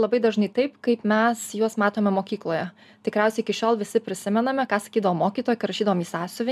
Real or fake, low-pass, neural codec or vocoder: real; 14.4 kHz; none